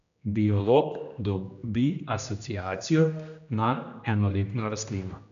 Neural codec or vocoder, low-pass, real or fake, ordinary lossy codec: codec, 16 kHz, 1 kbps, X-Codec, HuBERT features, trained on general audio; 7.2 kHz; fake; none